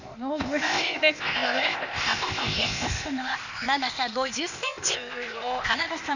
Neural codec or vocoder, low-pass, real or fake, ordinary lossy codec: codec, 16 kHz, 0.8 kbps, ZipCodec; 7.2 kHz; fake; none